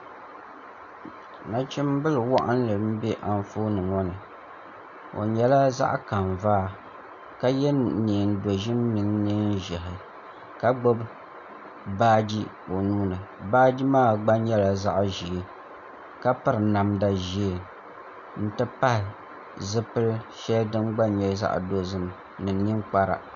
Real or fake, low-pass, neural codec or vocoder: real; 7.2 kHz; none